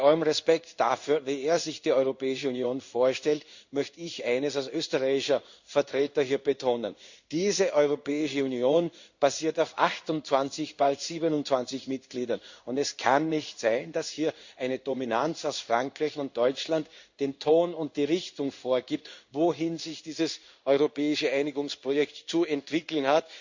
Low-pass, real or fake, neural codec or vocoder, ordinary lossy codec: 7.2 kHz; fake; codec, 16 kHz in and 24 kHz out, 1 kbps, XY-Tokenizer; Opus, 64 kbps